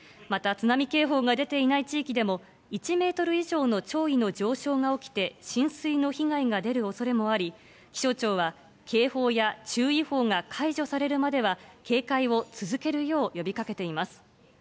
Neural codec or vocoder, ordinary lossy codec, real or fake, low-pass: none; none; real; none